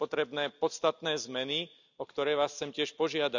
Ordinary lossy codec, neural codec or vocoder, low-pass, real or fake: none; none; 7.2 kHz; real